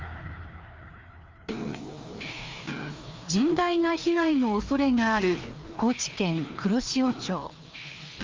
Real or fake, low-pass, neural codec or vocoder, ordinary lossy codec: fake; 7.2 kHz; codec, 16 kHz, 2 kbps, FreqCodec, larger model; Opus, 32 kbps